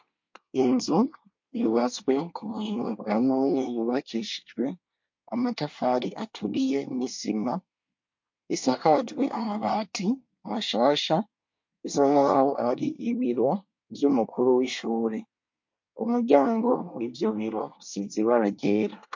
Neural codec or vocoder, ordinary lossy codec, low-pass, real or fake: codec, 24 kHz, 1 kbps, SNAC; MP3, 48 kbps; 7.2 kHz; fake